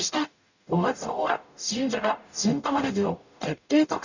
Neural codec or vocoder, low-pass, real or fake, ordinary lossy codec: codec, 44.1 kHz, 0.9 kbps, DAC; 7.2 kHz; fake; none